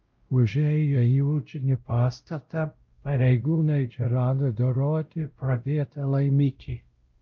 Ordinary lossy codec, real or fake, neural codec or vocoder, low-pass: Opus, 32 kbps; fake; codec, 16 kHz, 0.5 kbps, X-Codec, WavLM features, trained on Multilingual LibriSpeech; 7.2 kHz